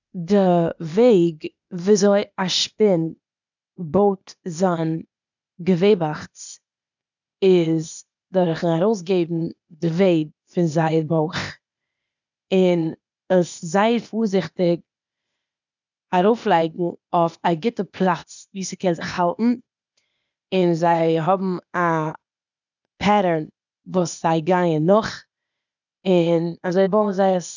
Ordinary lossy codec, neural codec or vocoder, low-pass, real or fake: none; codec, 16 kHz, 0.8 kbps, ZipCodec; 7.2 kHz; fake